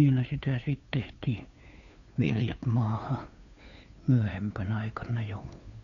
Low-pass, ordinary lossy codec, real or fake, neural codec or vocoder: 7.2 kHz; none; fake; codec, 16 kHz, 2 kbps, FunCodec, trained on Chinese and English, 25 frames a second